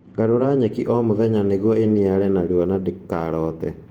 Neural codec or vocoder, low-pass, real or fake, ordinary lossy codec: vocoder, 48 kHz, 128 mel bands, Vocos; 19.8 kHz; fake; Opus, 24 kbps